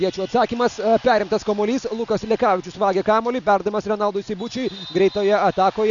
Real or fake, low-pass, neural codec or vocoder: real; 7.2 kHz; none